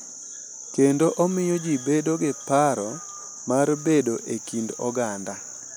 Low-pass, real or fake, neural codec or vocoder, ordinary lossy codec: none; real; none; none